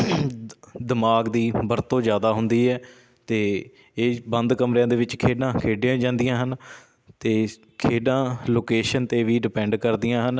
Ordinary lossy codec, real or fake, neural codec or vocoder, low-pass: none; real; none; none